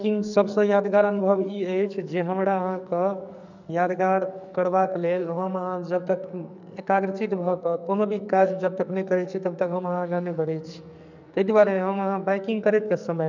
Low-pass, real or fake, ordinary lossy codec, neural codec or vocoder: 7.2 kHz; fake; none; codec, 44.1 kHz, 2.6 kbps, SNAC